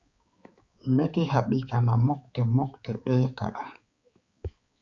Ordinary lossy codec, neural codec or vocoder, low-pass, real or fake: Opus, 64 kbps; codec, 16 kHz, 4 kbps, X-Codec, HuBERT features, trained on balanced general audio; 7.2 kHz; fake